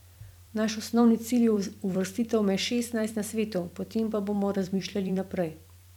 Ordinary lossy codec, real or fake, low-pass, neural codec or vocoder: none; fake; 19.8 kHz; vocoder, 44.1 kHz, 128 mel bands every 256 samples, BigVGAN v2